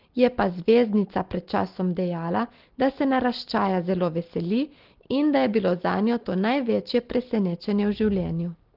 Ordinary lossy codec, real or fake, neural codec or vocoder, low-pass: Opus, 16 kbps; real; none; 5.4 kHz